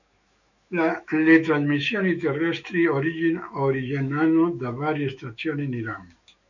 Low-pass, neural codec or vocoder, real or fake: 7.2 kHz; autoencoder, 48 kHz, 128 numbers a frame, DAC-VAE, trained on Japanese speech; fake